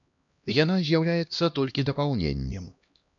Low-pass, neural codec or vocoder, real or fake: 7.2 kHz; codec, 16 kHz, 1 kbps, X-Codec, HuBERT features, trained on LibriSpeech; fake